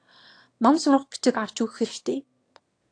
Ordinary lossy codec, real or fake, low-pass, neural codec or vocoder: AAC, 48 kbps; fake; 9.9 kHz; autoencoder, 22.05 kHz, a latent of 192 numbers a frame, VITS, trained on one speaker